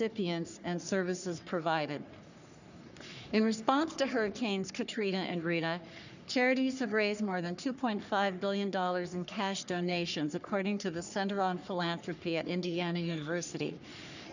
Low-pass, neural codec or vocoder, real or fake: 7.2 kHz; codec, 44.1 kHz, 3.4 kbps, Pupu-Codec; fake